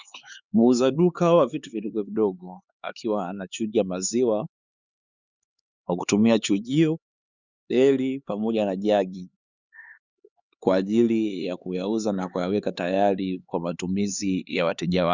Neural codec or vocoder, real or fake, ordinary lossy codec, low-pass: codec, 16 kHz, 4 kbps, X-Codec, HuBERT features, trained on LibriSpeech; fake; Opus, 64 kbps; 7.2 kHz